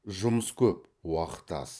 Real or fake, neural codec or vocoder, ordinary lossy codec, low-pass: fake; vocoder, 22.05 kHz, 80 mel bands, Vocos; none; none